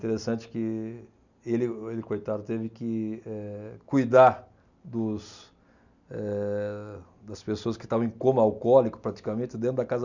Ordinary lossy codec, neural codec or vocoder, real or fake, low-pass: none; none; real; 7.2 kHz